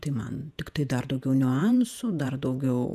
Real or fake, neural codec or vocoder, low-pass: fake; vocoder, 48 kHz, 128 mel bands, Vocos; 14.4 kHz